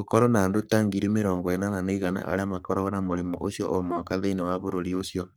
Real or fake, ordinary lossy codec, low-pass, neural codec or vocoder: fake; none; none; codec, 44.1 kHz, 3.4 kbps, Pupu-Codec